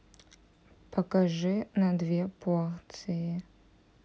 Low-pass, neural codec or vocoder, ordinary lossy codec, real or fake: none; none; none; real